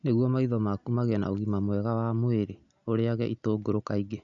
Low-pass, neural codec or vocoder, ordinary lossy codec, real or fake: 7.2 kHz; none; none; real